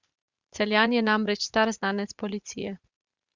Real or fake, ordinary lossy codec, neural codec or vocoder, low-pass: fake; Opus, 64 kbps; vocoder, 44.1 kHz, 80 mel bands, Vocos; 7.2 kHz